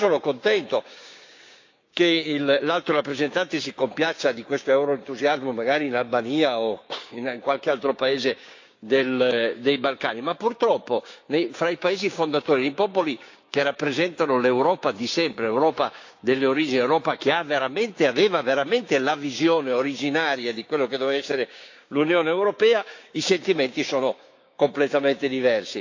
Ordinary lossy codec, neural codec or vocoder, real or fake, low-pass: AAC, 48 kbps; codec, 16 kHz, 6 kbps, DAC; fake; 7.2 kHz